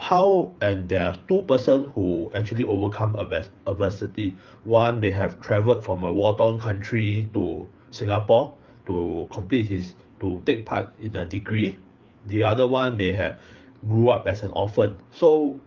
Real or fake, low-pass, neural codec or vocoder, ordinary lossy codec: fake; 7.2 kHz; codec, 16 kHz, 4 kbps, FreqCodec, larger model; Opus, 24 kbps